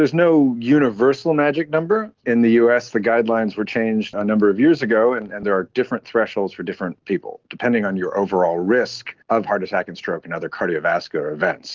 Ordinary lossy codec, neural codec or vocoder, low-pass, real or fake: Opus, 16 kbps; none; 7.2 kHz; real